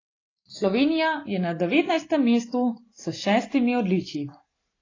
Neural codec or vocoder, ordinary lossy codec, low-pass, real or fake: none; AAC, 32 kbps; 7.2 kHz; real